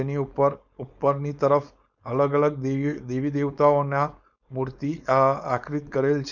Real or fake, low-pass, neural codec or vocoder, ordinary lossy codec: fake; 7.2 kHz; codec, 16 kHz, 4.8 kbps, FACodec; none